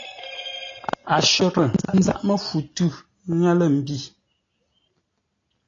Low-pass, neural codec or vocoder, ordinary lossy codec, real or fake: 7.2 kHz; none; AAC, 32 kbps; real